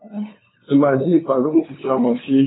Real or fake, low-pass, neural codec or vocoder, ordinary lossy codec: fake; 7.2 kHz; codec, 16 kHz, 2 kbps, FunCodec, trained on LibriTTS, 25 frames a second; AAC, 16 kbps